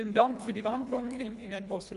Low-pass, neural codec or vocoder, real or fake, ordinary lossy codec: 10.8 kHz; codec, 24 kHz, 1.5 kbps, HILCodec; fake; MP3, 96 kbps